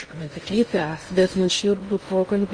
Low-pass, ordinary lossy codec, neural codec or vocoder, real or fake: 9.9 kHz; Opus, 24 kbps; codec, 16 kHz in and 24 kHz out, 0.6 kbps, FocalCodec, streaming, 2048 codes; fake